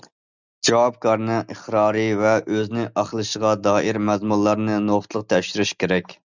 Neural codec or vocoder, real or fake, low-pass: none; real; 7.2 kHz